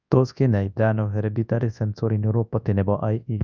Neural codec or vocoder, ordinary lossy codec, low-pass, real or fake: codec, 24 kHz, 0.9 kbps, WavTokenizer, large speech release; none; 7.2 kHz; fake